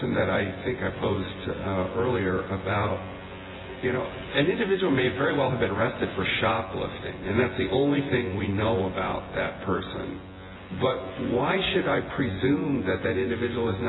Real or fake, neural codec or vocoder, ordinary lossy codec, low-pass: fake; vocoder, 24 kHz, 100 mel bands, Vocos; AAC, 16 kbps; 7.2 kHz